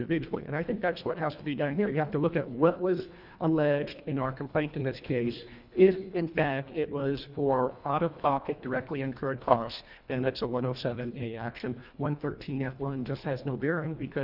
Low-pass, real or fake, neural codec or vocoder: 5.4 kHz; fake; codec, 24 kHz, 1.5 kbps, HILCodec